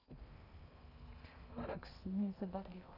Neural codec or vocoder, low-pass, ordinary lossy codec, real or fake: codec, 16 kHz in and 24 kHz out, 0.6 kbps, FocalCodec, streaming, 2048 codes; 5.4 kHz; none; fake